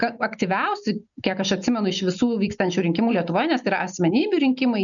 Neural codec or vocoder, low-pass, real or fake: none; 7.2 kHz; real